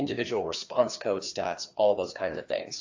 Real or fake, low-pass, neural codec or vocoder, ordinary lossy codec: fake; 7.2 kHz; codec, 16 kHz, 2 kbps, FreqCodec, larger model; AAC, 48 kbps